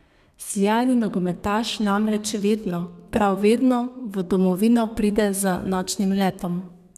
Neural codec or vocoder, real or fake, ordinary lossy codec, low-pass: codec, 32 kHz, 1.9 kbps, SNAC; fake; none; 14.4 kHz